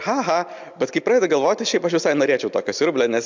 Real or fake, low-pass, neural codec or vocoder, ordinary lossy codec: real; 7.2 kHz; none; MP3, 64 kbps